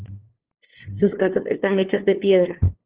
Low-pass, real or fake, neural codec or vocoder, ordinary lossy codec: 3.6 kHz; fake; codec, 16 kHz, 2 kbps, FunCodec, trained on LibriTTS, 25 frames a second; Opus, 16 kbps